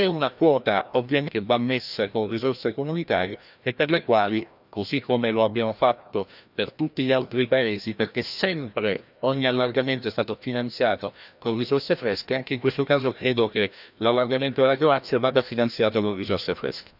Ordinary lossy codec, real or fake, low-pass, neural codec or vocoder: none; fake; 5.4 kHz; codec, 16 kHz, 1 kbps, FreqCodec, larger model